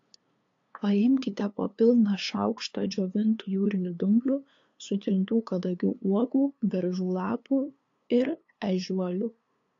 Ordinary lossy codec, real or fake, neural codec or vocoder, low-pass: AAC, 48 kbps; fake; codec, 16 kHz, 2 kbps, FunCodec, trained on LibriTTS, 25 frames a second; 7.2 kHz